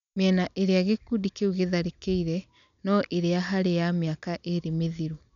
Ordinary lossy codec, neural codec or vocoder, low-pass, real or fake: none; none; 7.2 kHz; real